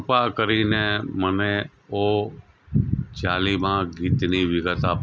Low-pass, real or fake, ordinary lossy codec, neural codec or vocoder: none; real; none; none